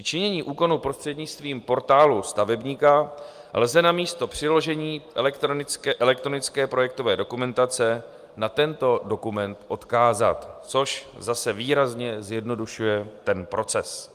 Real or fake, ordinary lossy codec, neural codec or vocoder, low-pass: real; Opus, 32 kbps; none; 14.4 kHz